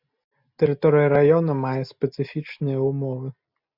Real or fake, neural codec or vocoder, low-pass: real; none; 5.4 kHz